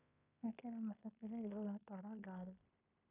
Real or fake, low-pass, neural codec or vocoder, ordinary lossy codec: fake; 3.6 kHz; codec, 16 kHz in and 24 kHz out, 0.9 kbps, LongCat-Audio-Codec, fine tuned four codebook decoder; none